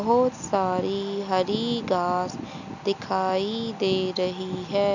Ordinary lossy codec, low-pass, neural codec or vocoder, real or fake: none; 7.2 kHz; none; real